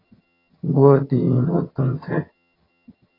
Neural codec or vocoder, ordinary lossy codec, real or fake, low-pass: vocoder, 22.05 kHz, 80 mel bands, HiFi-GAN; AAC, 24 kbps; fake; 5.4 kHz